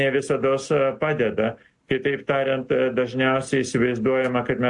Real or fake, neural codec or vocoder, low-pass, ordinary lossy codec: real; none; 10.8 kHz; AAC, 64 kbps